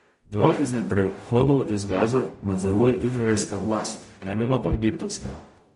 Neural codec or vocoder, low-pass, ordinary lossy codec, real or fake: codec, 44.1 kHz, 0.9 kbps, DAC; 14.4 kHz; MP3, 48 kbps; fake